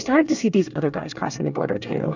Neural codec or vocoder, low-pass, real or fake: codec, 24 kHz, 1 kbps, SNAC; 7.2 kHz; fake